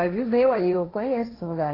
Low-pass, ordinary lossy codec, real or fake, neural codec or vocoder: 5.4 kHz; AAC, 24 kbps; fake; codec, 16 kHz, 1.1 kbps, Voila-Tokenizer